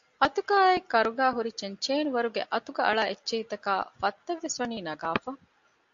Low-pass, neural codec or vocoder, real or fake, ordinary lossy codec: 7.2 kHz; none; real; AAC, 64 kbps